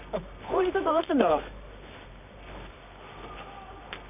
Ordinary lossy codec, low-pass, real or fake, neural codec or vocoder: none; 3.6 kHz; fake; codec, 24 kHz, 0.9 kbps, WavTokenizer, medium music audio release